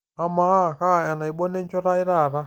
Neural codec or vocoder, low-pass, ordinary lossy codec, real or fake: none; 19.8 kHz; Opus, 24 kbps; real